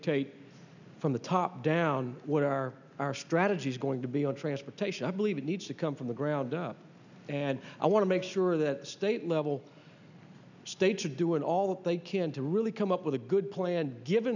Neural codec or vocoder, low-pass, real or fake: none; 7.2 kHz; real